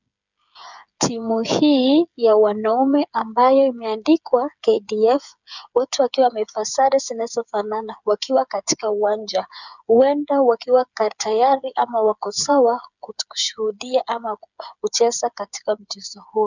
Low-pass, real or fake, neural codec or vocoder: 7.2 kHz; fake; codec, 16 kHz, 8 kbps, FreqCodec, smaller model